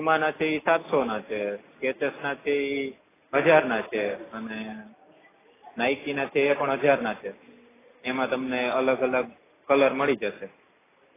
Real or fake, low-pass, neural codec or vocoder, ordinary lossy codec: real; 3.6 kHz; none; AAC, 16 kbps